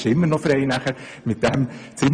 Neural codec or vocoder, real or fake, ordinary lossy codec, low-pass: vocoder, 24 kHz, 100 mel bands, Vocos; fake; none; 9.9 kHz